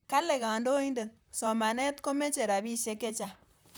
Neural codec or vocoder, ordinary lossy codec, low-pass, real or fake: vocoder, 44.1 kHz, 128 mel bands, Pupu-Vocoder; none; none; fake